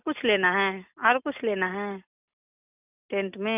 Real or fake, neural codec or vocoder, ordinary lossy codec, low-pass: real; none; none; 3.6 kHz